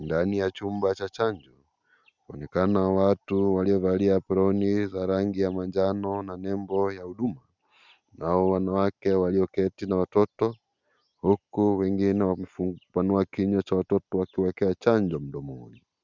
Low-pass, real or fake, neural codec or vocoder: 7.2 kHz; real; none